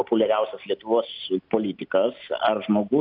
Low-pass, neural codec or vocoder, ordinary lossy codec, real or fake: 5.4 kHz; none; AAC, 48 kbps; real